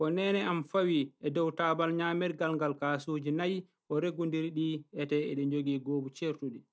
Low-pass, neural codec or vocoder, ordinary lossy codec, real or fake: none; none; none; real